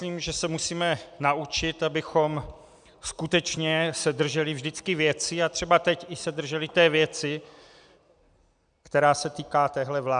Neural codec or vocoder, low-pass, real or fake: none; 9.9 kHz; real